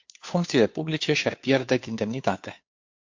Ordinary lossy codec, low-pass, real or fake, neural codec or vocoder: MP3, 48 kbps; 7.2 kHz; fake; codec, 16 kHz, 2 kbps, FunCodec, trained on Chinese and English, 25 frames a second